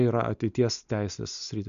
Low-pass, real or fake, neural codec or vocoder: 7.2 kHz; real; none